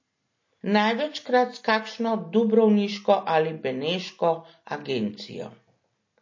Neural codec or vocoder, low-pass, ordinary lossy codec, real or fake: none; 7.2 kHz; MP3, 32 kbps; real